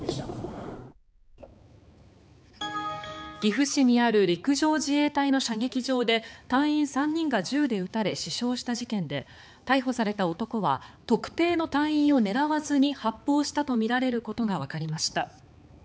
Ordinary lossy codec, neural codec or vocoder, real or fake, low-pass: none; codec, 16 kHz, 4 kbps, X-Codec, HuBERT features, trained on balanced general audio; fake; none